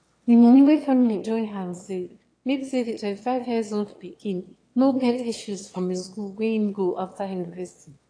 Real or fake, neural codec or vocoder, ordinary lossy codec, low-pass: fake; autoencoder, 22.05 kHz, a latent of 192 numbers a frame, VITS, trained on one speaker; AAC, 48 kbps; 9.9 kHz